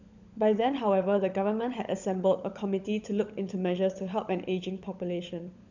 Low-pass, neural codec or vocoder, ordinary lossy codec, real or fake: 7.2 kHz; codec, 16 kHz, 16 kbps, FunCodec, trained on LibriTTS, 50 frames a second; none; fake